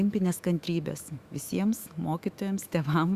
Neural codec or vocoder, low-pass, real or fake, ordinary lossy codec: autoencoder, 48 kHz, 128 numbers a frame, DAC-VAE, trained on Japanese speech; 14.4 kHz; fake; Opus, 64 kbps